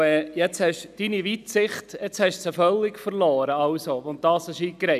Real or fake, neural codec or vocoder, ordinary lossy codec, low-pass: real; none; none; 14.4 kHz